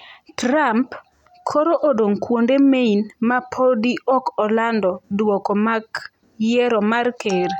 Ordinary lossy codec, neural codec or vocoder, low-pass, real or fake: none; none; 19.8 kHz; real